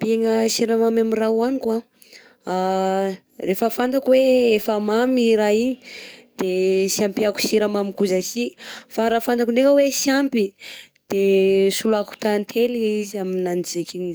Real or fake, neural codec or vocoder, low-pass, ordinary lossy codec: fake; codec, 44.1 kHz, 7.8 kbps, DAC; none; none